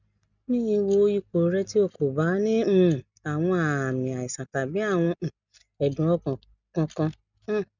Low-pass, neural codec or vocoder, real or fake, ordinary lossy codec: 7.2 kHz; none; real; none